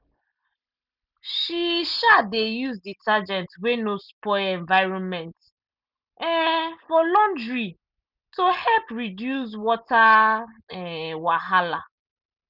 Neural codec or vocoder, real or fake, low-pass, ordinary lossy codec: none; real; 5.4 kHz; none